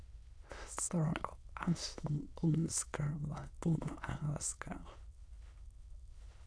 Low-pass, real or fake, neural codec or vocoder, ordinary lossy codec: none; fake; autoencoder, 22.05 kHz, a latent of 192 numbers a frame, VITS, trained on many speakers; none